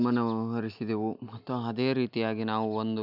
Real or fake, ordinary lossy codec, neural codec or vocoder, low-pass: real; none; none; 5.4 kHz